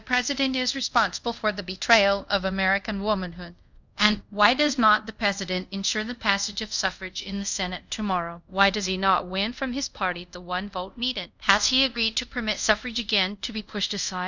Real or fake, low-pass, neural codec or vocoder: fake; 7.2 kHz; codec, 24 kHz, 0.5 kbps, DualCodec